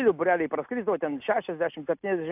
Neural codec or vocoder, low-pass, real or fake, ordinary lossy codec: none; 3.6 kHz; real; AAC, 32 kbps